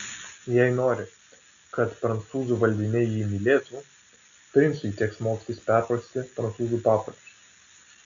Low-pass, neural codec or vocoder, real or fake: 7.2 kHz; none; real